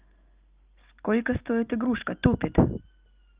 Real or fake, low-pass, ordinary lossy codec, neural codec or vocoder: real; 3.6 kHz; Opus, 24 kbps; none